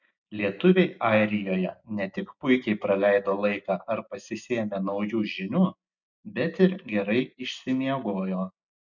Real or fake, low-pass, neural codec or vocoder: real; 7.2 kHz; none